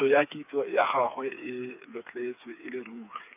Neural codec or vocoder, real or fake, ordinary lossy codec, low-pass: codec, 16 kHz, 4 kbps, FreqCodec, smaller model; fake; none; 3.6 kHz